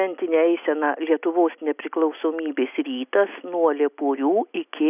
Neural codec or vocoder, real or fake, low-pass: none; real; 3.6 kHz